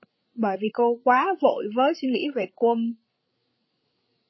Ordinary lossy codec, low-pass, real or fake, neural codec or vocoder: MP3, 24 kbps; 7.2 kHz; fake; codec, 16 kHz, 16 kbps, FreqCodec, larger model